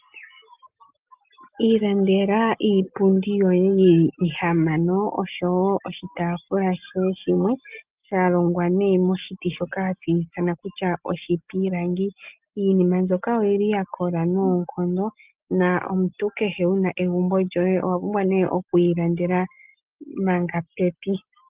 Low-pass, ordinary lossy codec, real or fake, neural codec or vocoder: 3.6 kHz; Opus, 24 kbps; real; none